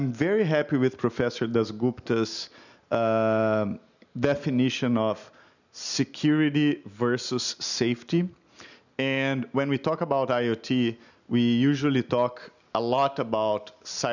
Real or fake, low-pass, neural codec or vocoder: real; 7.2 kHz; none